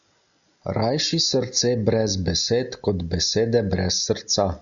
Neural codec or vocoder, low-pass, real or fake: none; 7.2 kHz; real